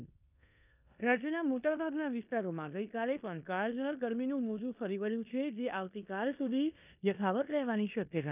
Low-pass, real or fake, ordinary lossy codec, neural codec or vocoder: 3.6 kHz; fake; none; codec, 16 kHz in and 24 kHz out, 0.9 kbps, LongCat-Audio-Codec, four codebook decoder